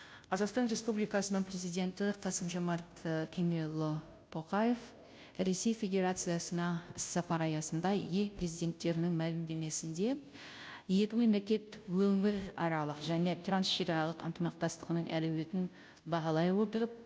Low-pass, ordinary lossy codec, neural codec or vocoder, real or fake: none; none; codec, 16 kHz, 0.5 kbps, FunCodec, trained on Chinese and English, 25 frames a second; fake